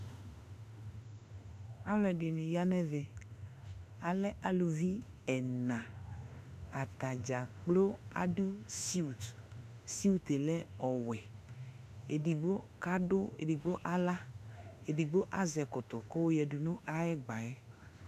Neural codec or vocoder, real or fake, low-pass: autoencoder, 48 kHz, 32 numbers a frame, DAC-VAE, trained on Japanese speech; fake; 14.4 kHz